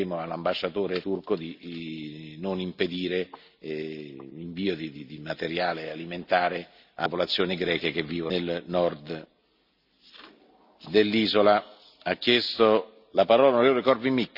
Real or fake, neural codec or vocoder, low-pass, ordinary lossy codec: real; none; 5.4 kHz; Opus, 64 kbps